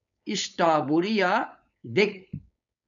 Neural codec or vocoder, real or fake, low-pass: codec, 16 kHz, 4.8 kbps, FACodec; fake; 7.2 kHz